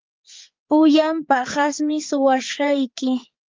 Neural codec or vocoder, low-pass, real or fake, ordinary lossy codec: codec, 16 kHz, 4 kbps, X-Codec, HuBERT features, trained on balanced general audio; 7.2 kHz; fake; Opus, 24 kbps